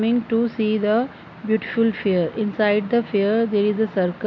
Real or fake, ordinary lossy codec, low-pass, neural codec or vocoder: real; MP3, 48 kbps; 7.2 kHz; none